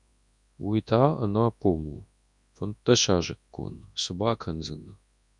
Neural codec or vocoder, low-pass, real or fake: codec, 24 kHz, 0.9 kbps, WavTokenizer, large speech release; 10.8 kHz; fake